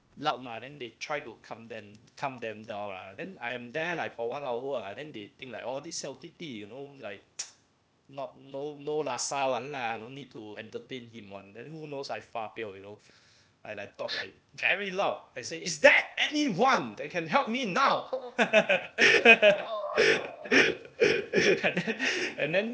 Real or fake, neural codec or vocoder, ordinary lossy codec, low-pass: fake; codec, 16 kHz, 0.8 kbps, ZipCodec; none; none